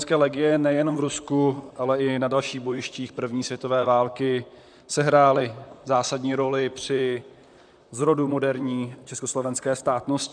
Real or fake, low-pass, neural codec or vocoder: fake; 9.9 kHz; vocoder, 44.1 kHz, 128 mel bands, Pupu-Vocoder